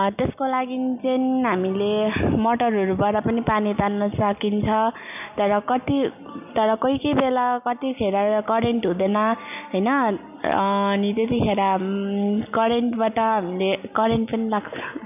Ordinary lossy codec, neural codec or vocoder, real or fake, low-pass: none; none; real; 3.6 kHz